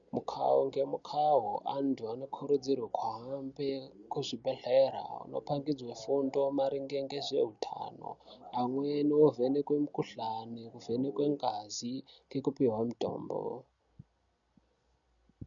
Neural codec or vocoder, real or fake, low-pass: none; real; 7.2 kHz